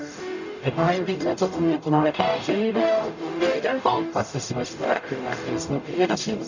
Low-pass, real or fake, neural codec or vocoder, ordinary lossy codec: 7.2 kHz; fake; codec, 44.1 kHz, 0.9 kbps, DAC; none